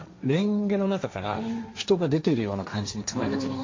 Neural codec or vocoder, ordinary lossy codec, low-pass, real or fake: codec, 16 kHz, 1.1 kbps, Voila-Tokenizer; MP3, 48 kbps; 7.2 kHz; fake